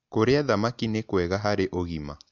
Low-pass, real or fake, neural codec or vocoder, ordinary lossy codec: 7.2 kHz; real; none; MP3, 64 kbps